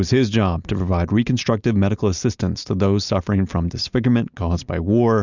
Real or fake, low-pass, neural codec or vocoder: real; 7.2 kHz; none